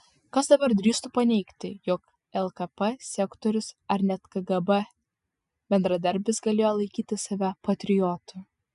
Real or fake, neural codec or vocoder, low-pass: real; none; 10.8 kHz